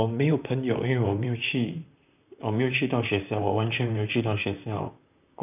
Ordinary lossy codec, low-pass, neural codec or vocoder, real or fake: none; 3.6 kHz; vocoder, 44.1 kHz, 128 mel bands, Pupu-Vocoder; fake